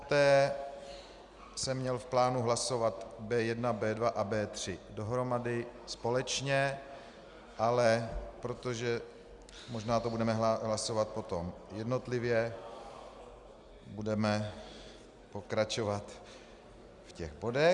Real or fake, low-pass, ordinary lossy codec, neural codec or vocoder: real; 10.8 kHz; Opus, 64 kbps; none